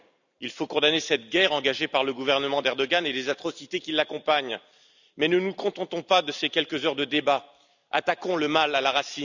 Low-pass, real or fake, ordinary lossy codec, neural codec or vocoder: 7.2 kHz; fake; none; vocoder, 44.1 kHz, 128 mel bands every 256 samples, BigVGAN v2